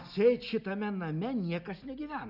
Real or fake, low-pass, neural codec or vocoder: real; 5.4 kHz; none